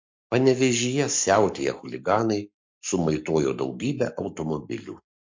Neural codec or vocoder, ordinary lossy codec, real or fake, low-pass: codec, 44.1 kHz, 7.8 kbps, Pupu-Codec; MP3, 48 kbps; fake; 7.2 kHz